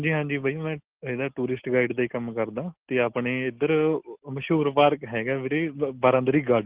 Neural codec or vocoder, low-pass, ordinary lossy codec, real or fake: none; 3.6 kHz; Opus, 16 kbps; real